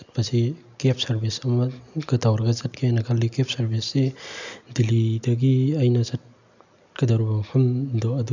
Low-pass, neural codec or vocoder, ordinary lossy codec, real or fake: 7.2 kHz; none; none; real